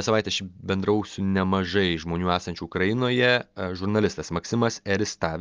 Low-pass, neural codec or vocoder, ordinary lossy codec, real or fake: 7.2 kHz; none; Opus, 32 kbps; real